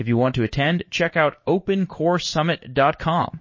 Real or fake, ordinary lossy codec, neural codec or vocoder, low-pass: fake; MP3, 32 kbps; codec, 16 kHz in and 24 kHz out, 1 kbps, XY-Tokenizer; 7.2 kHz